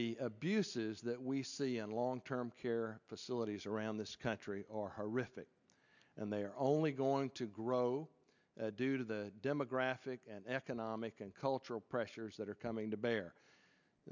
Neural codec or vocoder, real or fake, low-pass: none; real; 7.2 kHz